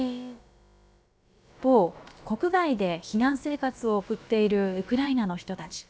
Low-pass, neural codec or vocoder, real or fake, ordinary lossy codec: none; codec, 16 kHz, about 1 kbps, DyCAST, with the encoder's durations; fake; none